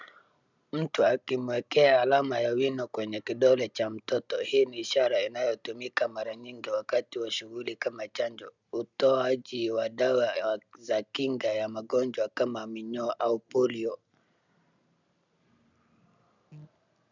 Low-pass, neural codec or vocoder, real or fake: 7.2 kHz; none; real